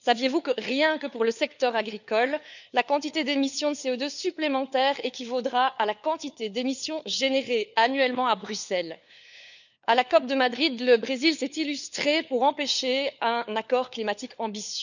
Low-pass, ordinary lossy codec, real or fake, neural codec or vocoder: 7.2 kHz; none; fake; codec, 16 kHz, 4 kbps, FunCodec, trained on LibriTTS, 50 frames a second